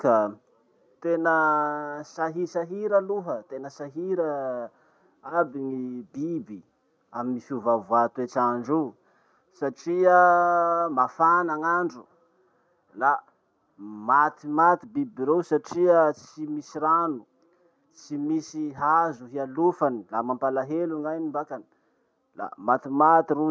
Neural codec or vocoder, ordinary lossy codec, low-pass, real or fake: none; none; none; real